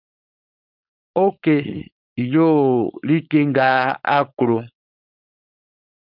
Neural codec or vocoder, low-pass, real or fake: codec, 16 kHz, 4.8 kbps, FACodec; 5.4 kHz; fake